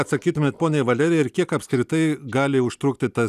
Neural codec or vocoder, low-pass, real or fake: none; 14.4 kHz; real